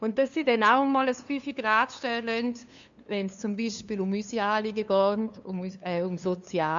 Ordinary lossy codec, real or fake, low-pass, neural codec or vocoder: MP3, 64 kbps; fake; 7.2 kHz; codec, 16 kHz, 2 kbps, FunCodec, trained on LibriTTS, 25 frames a second